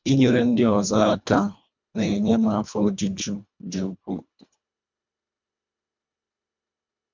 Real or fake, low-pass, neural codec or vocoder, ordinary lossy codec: fake; 7.2 kHz; codec, 24 kHz, 1.5 kbps, HILCodec; MP3, 64 kbps